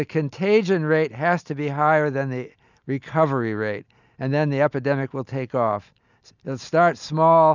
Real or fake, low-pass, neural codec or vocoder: real; 7.2 kHz; none